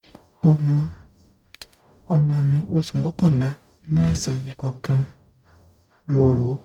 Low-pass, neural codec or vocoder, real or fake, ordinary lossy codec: 19.8 kHz; codec, 44.1 kHz, 0.9 kbps, DAC; fake; none